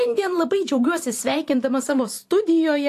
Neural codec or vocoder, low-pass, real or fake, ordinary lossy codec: vocoder, 44.1 kHz, 128 mel bands every 512 samples, BigVGAN v2; 14.4 kHz; fake; AAC, 64 kbps